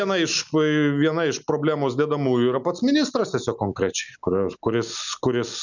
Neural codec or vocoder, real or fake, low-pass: none; real; 7.2 kHz